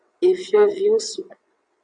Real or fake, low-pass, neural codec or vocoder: fake; 9.9 kHz; vocoder, 22.05 kHz, 80 mel bands, WaveNeXt